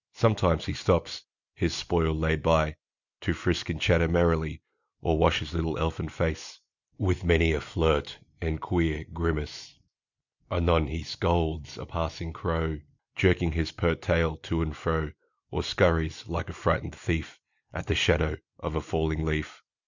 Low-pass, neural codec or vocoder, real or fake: 7.2 kHz; none; real